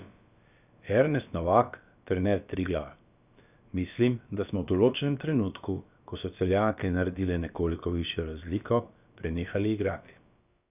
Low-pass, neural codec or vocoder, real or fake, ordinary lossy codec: 3.6 kHz; codec, 16 kHz, about 1 kbps, DyCAST, with the encoder's durations; fake; none